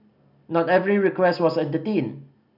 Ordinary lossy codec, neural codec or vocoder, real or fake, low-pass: none; none; real; 5.4 kHz